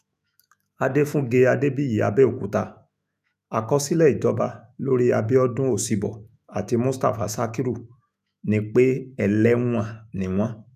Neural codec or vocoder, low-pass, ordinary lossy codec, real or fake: autoencoder, 48 kHz, 128 numbers a frame, DAC-VAE, trained on Japanese speech; 14.4 kHz; none; fake